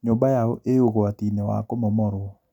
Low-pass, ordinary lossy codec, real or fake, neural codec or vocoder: 19.8 kHz; none; real; none